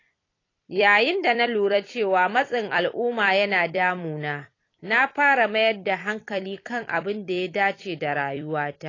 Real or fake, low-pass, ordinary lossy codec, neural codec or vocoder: fake; 7.2 kHz; AAC, 32 kbps; vocoder, 44.1 kHz, 128 mel bands every 256 samples, BigVGAN v2